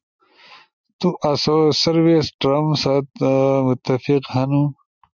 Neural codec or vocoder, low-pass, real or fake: none; 7.2 kHz; real